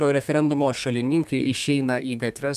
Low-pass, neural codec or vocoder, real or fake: 14.4 kHz; codec, 32 kHz, 1.9 kbps, SNAC; fake